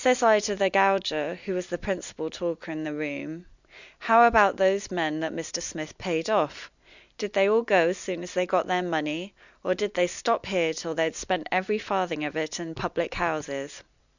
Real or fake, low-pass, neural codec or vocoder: real; 7.2 kHz; none